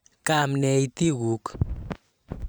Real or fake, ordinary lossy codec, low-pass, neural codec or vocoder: fake; none; none; vocoder, 44.1 kHz, 128 mel bands every 512 samples, BigVGAN v2